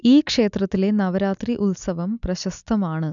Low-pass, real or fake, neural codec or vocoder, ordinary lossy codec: 7.2 kHz; real; none; none